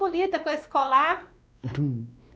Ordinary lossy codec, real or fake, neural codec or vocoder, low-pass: none; fake; codec, 16 kHz, 2 kbps, X-Codec, WavLM features, trained on Multilingual LibriSpeech; none